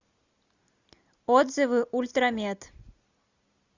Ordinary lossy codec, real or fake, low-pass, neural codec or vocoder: Opus, 64 kbps; real; 7.2 kHz; none